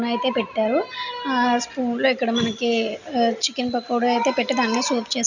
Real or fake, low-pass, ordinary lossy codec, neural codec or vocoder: real; 7.2 kHz; none; none